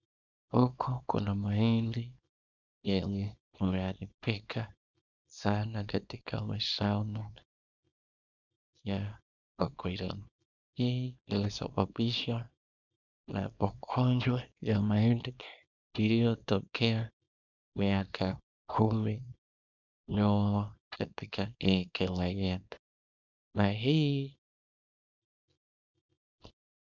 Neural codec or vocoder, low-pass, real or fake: codec, 24 kHz, 0.9 kbps, WavTokenizer, small release; 7.2 kHz; fake